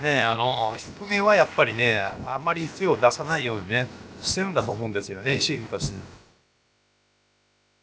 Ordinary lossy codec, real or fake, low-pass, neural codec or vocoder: none; fake; none; codec, 16 kHz, about 1 kbps, DyCAST, with the encoder's durations